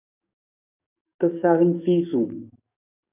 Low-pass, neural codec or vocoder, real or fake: 3.6 kHz; codec, 44.1 kHz, 7.8 kbps, DAC; fake